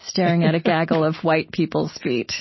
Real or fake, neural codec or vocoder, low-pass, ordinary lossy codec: real; none; 7.2 kHz; MP3, 24 kbps